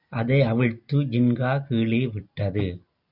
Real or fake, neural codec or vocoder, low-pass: fake; vocoder, 44.1 kHz, 128 mel bands every 256 samples, BigVGAN v2; 5.4 kHz